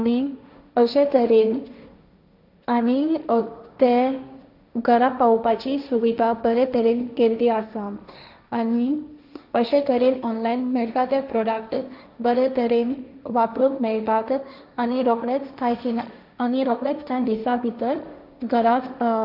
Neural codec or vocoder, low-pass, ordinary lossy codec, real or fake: codec, 16 kHz, 1.1 kbps, Voila-Tokenizer; 5.4 kHz; none; fake